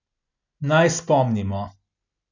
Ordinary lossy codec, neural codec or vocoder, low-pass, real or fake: none; none; 7.2 kHz; real